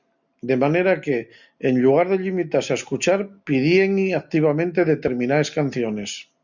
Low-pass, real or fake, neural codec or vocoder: 7.2 kHz; real; none